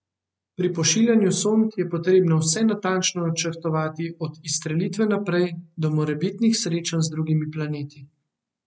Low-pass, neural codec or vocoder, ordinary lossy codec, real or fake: none; none; none; real